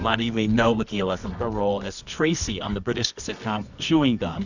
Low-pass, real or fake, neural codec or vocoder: 7.2 kHz; fake; codec, 24 kHz, 0.9 kbps, WavTokenizer, medium music audio release